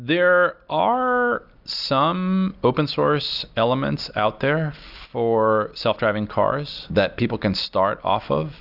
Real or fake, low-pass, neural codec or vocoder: real; 5.4 kHz; none